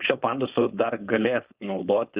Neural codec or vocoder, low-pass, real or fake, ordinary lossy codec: codec, 16 kHz, 4.8 kbps, FACodec; 3.6 kHz; fake; Opus, 64 kbps